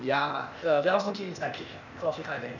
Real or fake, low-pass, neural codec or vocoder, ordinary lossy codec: fake; 7.2 kHz; codec, 16 kHz, 0.8 kbps, ZipCodec; none